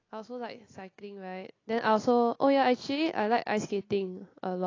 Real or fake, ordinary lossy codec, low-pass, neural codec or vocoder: real; AAC, 32 kbps; 7.2 kHz; none